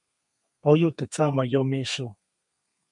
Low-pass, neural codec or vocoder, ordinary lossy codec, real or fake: 10.8 kHz; codec, 32 kHz, 1.9 kbps, SNAC; MP3, 64 kbps; fake